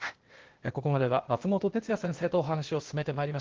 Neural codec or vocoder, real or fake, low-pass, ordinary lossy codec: codec, 16 kHz, 0.8 kbps, ZipCodec; fake; 7.2 kHz; Opus, 16 kbps